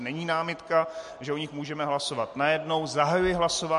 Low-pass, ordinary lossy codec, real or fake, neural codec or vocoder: 14.4 kHz; MP3, 48 kbps; real; none